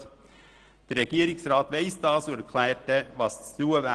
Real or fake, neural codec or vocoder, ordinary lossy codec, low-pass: real; none; Opus, 16 kbps; 9.9 kHz